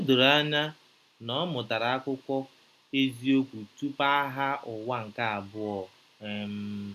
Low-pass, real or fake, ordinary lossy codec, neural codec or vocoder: 14.4 kHz; real; none; none